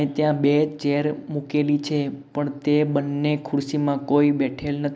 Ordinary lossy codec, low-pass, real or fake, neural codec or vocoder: none; none; real; none